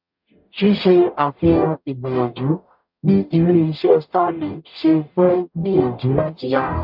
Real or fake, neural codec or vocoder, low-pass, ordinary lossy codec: fake; codec, 44.1 kHz, 0.9 kbps, DAC; 5.4 kHz; MP3, 48 kbps